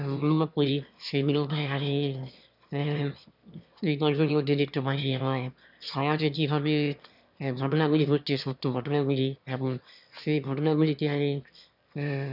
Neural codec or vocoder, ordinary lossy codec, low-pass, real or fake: autoencoder, 22.05 kHz, a latent of 192 numbers a frame, VITS, trained on one speaker; none; 5.4 kHz; fake